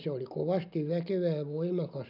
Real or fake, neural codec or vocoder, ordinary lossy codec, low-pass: real; none; none; 5.4 kHz